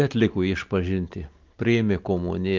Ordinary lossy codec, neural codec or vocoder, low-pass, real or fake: Opus, 24 kbps; none; 7.2 kHz; real